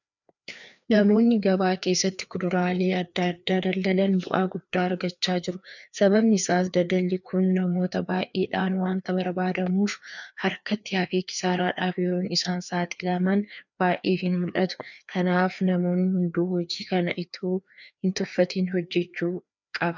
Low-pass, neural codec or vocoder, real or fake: 7.2 kHz; codec, 16 kHz, 2 kbps, FreqCodec, larger model; fake